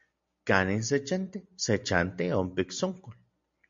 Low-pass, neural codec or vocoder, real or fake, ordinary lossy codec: 7.2 kHz; none; real; MP3, 48 kbps